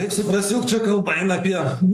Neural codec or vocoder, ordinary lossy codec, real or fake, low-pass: codec, 44.1 kHz, 7.8 kbps, Pupu-Codec; AAC, 64 kbps; fake; 14.4 kHz